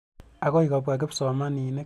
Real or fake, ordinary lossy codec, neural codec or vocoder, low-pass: real; none; none; 14.4 kHz